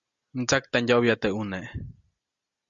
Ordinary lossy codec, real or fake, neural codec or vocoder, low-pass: Opus, 64 kbps; real; none; 7.2 kHz